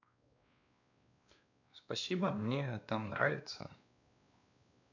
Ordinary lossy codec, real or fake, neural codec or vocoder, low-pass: none; fake; codec, 16 kHz, 2 kbps, X-Codec, WavLM features, trained on Multilingual LibriSpeech; 7.2 kHz